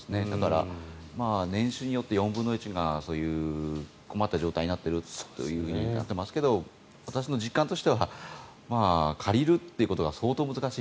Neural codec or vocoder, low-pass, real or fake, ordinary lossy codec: none; none; real; none